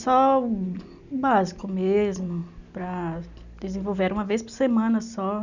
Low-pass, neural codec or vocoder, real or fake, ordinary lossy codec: 7.2 kHz; none; real; none